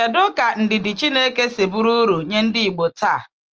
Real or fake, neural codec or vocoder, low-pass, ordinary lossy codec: real; none; 7.2 kHz; Opus, 24 kbps